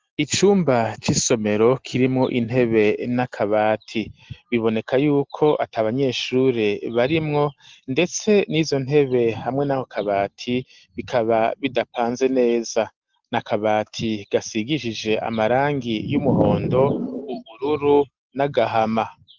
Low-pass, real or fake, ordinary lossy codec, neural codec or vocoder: 7.2 kHz; real; Opus, 16 kbps; none